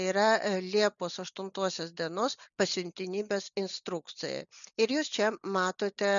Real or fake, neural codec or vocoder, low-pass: real; none; 7.2 kHz